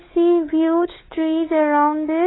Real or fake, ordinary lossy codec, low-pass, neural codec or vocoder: fake; AAC, 16 kbps; 7.2 kHz; codec, 16 kHz, 16 kbps, FunCodec, trained on LibriTTS, 50 frames a second